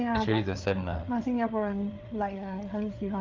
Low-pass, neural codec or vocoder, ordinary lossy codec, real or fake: 7.2 kHz; codec, 16 kHz, 8 kbps, FreqCodec, larger model; Opus, 32 kbps; fake